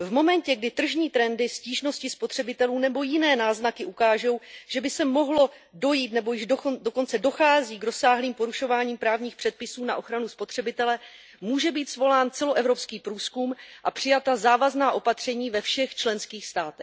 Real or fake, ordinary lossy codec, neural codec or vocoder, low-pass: real; none; none; none